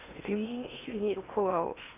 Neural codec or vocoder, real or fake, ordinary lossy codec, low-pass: codec, 16 kHz in and 24 kHz out, 0.8 kbps, FocalCodec, streaming, 65536 codes; fake; none; 3.6 kHz